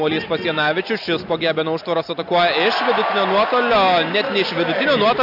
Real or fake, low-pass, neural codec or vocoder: real; 5.4 kHz; none